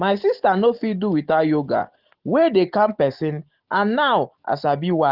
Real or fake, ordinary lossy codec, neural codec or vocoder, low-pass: real; Opus, 16 kbps; none; 5.4 kHz